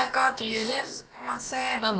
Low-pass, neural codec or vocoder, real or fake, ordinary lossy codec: none; codec, 16 kHz, about 1 kbps, DyCAST, with the encoder's durations; fake; none